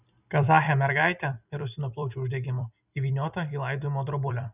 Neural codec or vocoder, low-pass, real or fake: vocoder, 44.1 kHz, 128 mel bands every 256 samples, BigVGAN v2; 3.6 kHz; fake